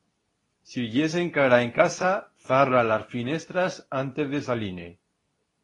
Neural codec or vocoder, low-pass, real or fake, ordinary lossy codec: codec, 24 kHz, 0.9 kbps, WavTokenizer, medium speech release version 2; 10.8 kHz; fake; AAC, 32 kbps